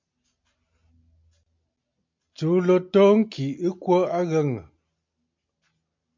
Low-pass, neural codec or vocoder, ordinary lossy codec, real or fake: 7.2 kHz; none; MP3, 48 kbps; real